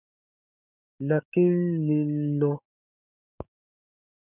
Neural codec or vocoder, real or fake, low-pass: codec, 16 kHz, 6 kbps, DAC; fake; 3.6 kHz